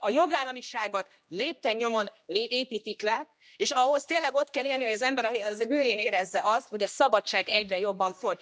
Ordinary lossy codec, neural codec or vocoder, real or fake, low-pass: none; codec, 16 kHz, 1 kbps, X-Codec, HuBERT features, trained on general audio; fake; none